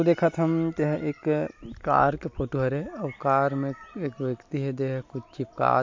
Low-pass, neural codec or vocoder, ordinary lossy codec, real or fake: 7.2 kHz; none; MP3, 48 kbps; real